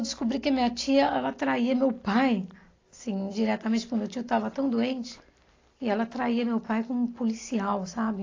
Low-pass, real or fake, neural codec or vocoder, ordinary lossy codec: 7.2 kHz; real; none; AAC, 32 kbps